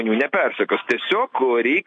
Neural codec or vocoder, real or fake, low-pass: none; real; 10.8 kHz